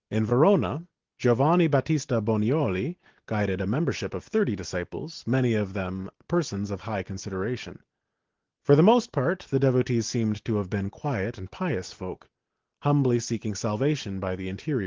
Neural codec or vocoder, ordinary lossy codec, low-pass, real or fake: none; Opus, 16 kbps; 7.2 kHz; real